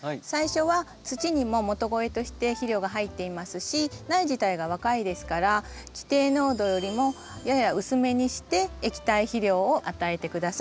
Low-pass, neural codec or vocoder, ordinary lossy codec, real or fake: none; none; none; real